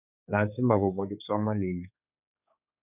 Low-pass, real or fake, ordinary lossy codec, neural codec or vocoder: 3.6 kHz; fake; none; codec, 16 kHz, 4 kbps, X-Codec, HuBERT features, trained on general audio